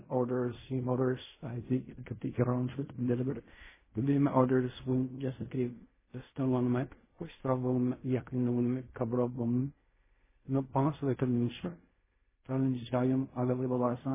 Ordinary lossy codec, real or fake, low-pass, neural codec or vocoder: MP3, 16 kbps; fake; 3.6 kHz; codec, 16 kHz in and 24 kHz out, 0.4 kbps, LongCat-Audio-Codec, fine tuned four codebook decoder